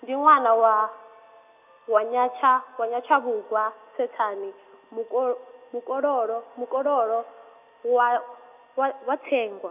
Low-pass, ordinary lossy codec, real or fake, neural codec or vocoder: 3.6 kHz; none; real; none